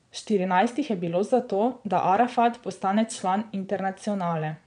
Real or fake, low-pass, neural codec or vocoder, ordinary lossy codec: fake; 9.9 kHz; vocoder, 22.05 kHz, 80 mel bands, Vocos; none